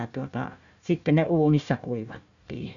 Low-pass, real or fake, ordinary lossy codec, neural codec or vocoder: 7.2 kHz; fake; none; codec, 16 kHz, 1 kbps, FunCodec, trained on Chinese and English, 50 frames a second